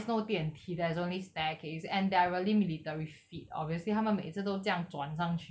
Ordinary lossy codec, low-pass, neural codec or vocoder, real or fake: none; none; none; real